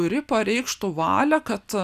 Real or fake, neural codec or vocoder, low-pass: real; none; 14.4 kHz